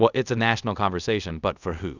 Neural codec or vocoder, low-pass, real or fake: codec, 24 kHz, 0.5 kbps, DualCodec; 7.2 kHz; fake